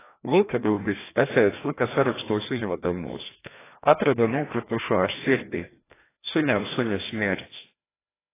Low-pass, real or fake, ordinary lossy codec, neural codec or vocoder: 3.6 kHz; fake; AAC, 16 kbps; codec, 16 kHz, 1 kbps, FreqCodec, larger model